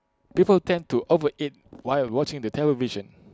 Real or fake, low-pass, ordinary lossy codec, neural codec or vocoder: real; none; none; none